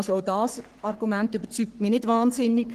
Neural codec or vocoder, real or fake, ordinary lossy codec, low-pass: codec, 44.1 kHz, 3.4 kbps, Pupu-Codec; fake; Opus, 16 kbps; 14.4 kHz